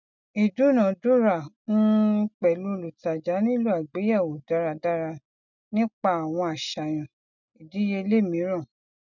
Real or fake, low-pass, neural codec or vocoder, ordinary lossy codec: real; 7.2 kHz; none; none